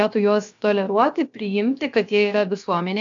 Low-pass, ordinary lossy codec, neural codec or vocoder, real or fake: 7.2 kHz; AAC, 64 kbps; codec, 16 kHz, about 1 kbps, DyCAST, with the encoder's durations; fake